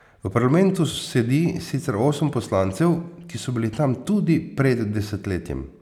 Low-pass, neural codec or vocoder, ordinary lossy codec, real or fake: 19.8 kHz; none; none; real